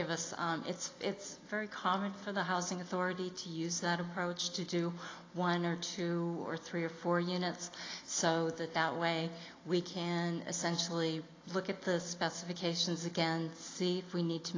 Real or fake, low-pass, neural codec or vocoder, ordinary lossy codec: real; 7.2 kHz; none; AAC, 32 kbps